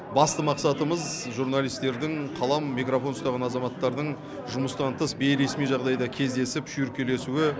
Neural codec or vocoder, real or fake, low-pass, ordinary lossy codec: none; real; none; none